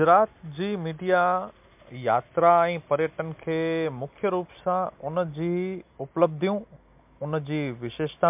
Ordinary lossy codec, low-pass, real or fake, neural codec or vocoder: MP3, 32 kbps; 3.6 kHz; real; none